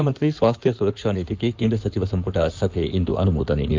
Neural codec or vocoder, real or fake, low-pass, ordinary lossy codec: codec, 16 kHz in and 24 kHz out, 2.2 kbps, FireRedTTS-2 codec; fake; 7.2 kHz; Opus, 32 kbps